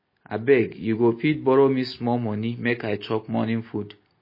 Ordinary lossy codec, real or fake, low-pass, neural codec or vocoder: MP3, 24 kbps; real; 5.4 kHz; none